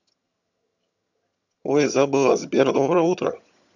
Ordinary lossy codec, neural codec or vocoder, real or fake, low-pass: none; vocoder, 22.05 kHz, 80 mel bands, HiFi-GAN; fake; 7.2 kHz